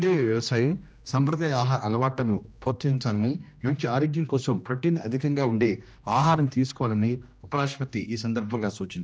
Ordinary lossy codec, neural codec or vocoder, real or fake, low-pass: none; codec, 16 kHz, 1 kbps, X-Codec, HuBERT features, trained on general audio; fake; none